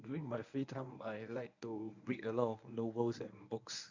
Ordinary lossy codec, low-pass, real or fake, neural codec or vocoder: AAC, 48 kbps; 7.2 kHz; fake; codec, 24 kHz, 0.9 kbps, WavTokenizer, medium speech release version 1